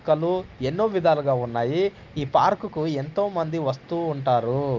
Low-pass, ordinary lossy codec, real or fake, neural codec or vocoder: 7.2 kHz; Opus, 32 kbps; real; none